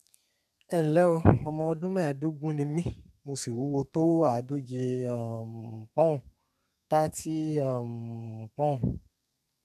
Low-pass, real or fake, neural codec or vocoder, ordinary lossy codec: 14.4 kHz; fake; codec, 32 kHz, 1.9 kbps, SNAC; none